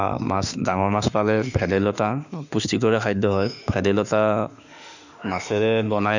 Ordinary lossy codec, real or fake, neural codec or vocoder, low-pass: none; fake; autoencoder, 48 kHz, 32 numbers a frame, DAC-VAE, trained on Japanese speech; 7.2 kHz